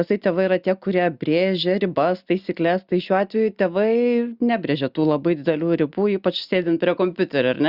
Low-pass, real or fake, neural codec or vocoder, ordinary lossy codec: 5.4 kHz; real; none; Opus, 64 kbps